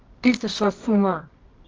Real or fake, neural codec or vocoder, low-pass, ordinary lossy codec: fake; codec, 24 kHz, 0.9 kbps, WavTokenizer, medium music audio release; 7.2 kHz; Opus, 24 kbps